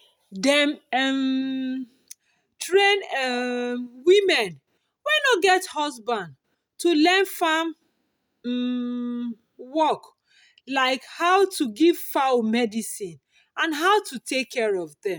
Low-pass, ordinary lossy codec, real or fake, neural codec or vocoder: none; none; real; none